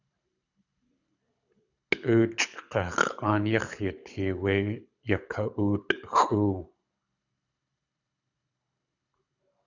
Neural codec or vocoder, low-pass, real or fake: codec, 24 kHz, 6 kbps, HILCodec; 7.2 kHz; fake